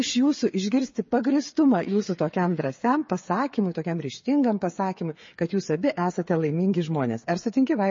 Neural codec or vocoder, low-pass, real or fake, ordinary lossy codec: codec, 16 kHz, 8 kbps, FreqCodec, smaller model; 7.2 kHz; fake; MP3, 32 kbps